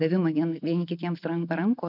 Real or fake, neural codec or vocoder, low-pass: real; none; 5.4 kHz